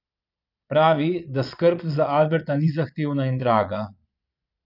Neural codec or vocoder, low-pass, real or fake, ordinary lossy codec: vocoder, 22.05 kHz, 80 mel bands, Vocos; 5.4 kHz; fake; none